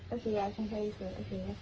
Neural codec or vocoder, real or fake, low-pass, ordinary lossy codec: codec, 44.1 kHz, 3.4 kbps, Pupu-Codec; fake; 7.2 kHz; Opus, 24 kbps